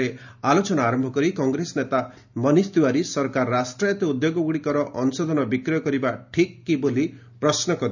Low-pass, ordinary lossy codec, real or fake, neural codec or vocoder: 7.2 kHz; none; real; none